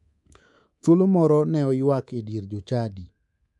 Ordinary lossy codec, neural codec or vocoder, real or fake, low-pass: none; codec, 24 kHz, 3.1 kbps, DualCodec; fake; 10.8 kHz